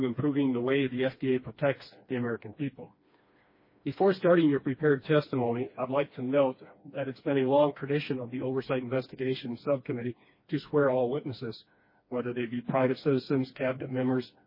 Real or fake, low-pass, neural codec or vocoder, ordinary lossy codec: fake; 5.4 kHz; codec, 16 kHz, 2 kbps, FreqCodec, smaller model; MP3, 24 kbps